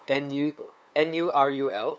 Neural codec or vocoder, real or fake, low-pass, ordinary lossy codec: codec, 16 kHz, 2 kbps, FunCodec, trained on LibriTTS, 25 frames a second; fake; none; none